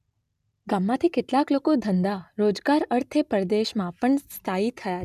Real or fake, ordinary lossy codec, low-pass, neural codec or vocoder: real; none; 14.4 kHz; none